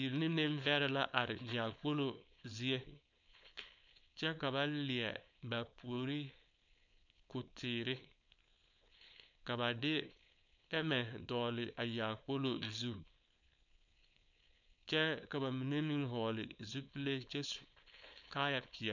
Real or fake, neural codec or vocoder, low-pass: fake; codec, 16 kHz, 4.8 kbps, FACodec; 7.2 kHz